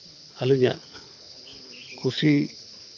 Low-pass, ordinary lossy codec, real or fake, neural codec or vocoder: 7.2 kHz; none; fake; codec, 24 kHz, 6 kbps, HILCodec